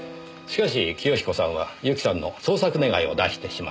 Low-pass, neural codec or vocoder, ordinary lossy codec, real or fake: none; none; none; real